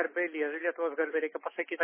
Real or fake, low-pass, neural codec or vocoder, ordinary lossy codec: real; 3.6 kHz; none; MP3, 16 kbps